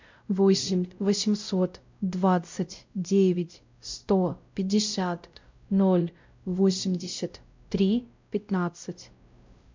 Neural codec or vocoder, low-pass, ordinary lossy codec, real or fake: codec, 16 kHz, 0.5 kbps, X-Codec, WavLM features, trained on Multilingual LibriSpeech; 7.2 kHz; MP3, 64 kbps; fake